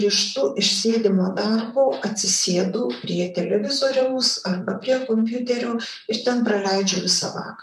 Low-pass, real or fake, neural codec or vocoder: 14.4 kHz; fake; vocoder, 44.1 kHz, 128 mel bands, Pupu-Vocoder